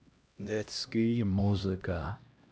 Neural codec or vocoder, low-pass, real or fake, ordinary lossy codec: codec, 16 kHz, 1 kbps, X-Codec, HuBERT features, trained on LibriSpeech; none; fake; none